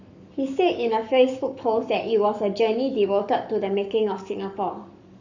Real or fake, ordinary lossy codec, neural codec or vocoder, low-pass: fake; none; codec, 44.1 kHz, 7.8 kbps, Pupu-Codec; 7.2 kHz